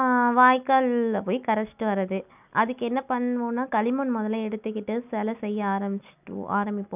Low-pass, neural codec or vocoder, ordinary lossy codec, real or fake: 3.6 kHz; none; none; real